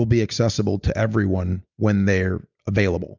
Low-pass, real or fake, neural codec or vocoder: 7.2 kHz; real; none